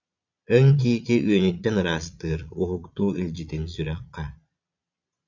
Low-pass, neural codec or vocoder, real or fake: 7.2 kHz; vocoder, 22.05 kHz, 80 mel bands, Vocos; fake